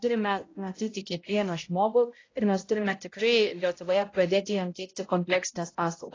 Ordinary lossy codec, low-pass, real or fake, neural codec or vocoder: AAC, 32 kbps; 7.2 kHz; fake; codec, 16 kHz, 0.5 kbps, X-Codec, HuBERT features, trained on balanced general audio